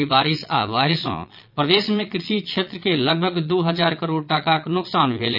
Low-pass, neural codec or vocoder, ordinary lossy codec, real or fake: 5.4 kHz; vocoder, 22.05 kHz, 80 mel bands, Vocos; none; fake